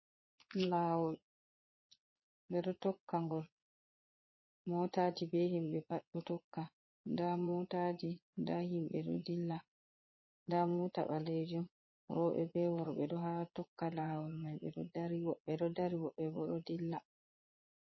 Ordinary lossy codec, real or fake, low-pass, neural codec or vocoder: MP3, 24 kbps; fake; 7.2 kHz; vocoder, 22.05 kHz, 80 mel bands, Vocos